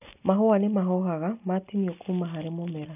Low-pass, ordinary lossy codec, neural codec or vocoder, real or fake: 3.6 kHz; none; none; real